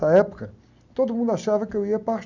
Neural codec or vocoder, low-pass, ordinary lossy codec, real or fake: none; 7.2 kHz; none; real